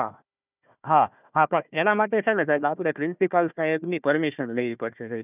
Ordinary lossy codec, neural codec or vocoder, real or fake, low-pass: none; codec, 16 kHz, 1 kbps, FunCodec, trained on Chinese and English, 50 frames a second; fake; 3.6 kHz